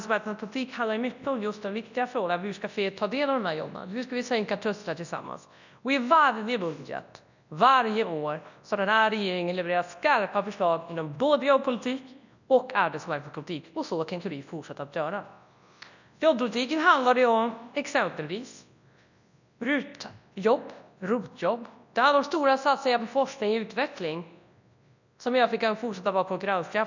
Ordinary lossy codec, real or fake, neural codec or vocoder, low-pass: none; fake; codec, 24 kHz, 0.9 kbps, WavTokenizer, large speech release; 7.2 kHz